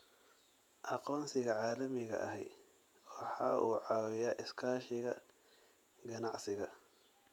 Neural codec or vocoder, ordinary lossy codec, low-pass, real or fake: vocoder, 48 kHz, 128 mel bands, Vocos; MP3, 96 kbps; 19.8 kHz; fake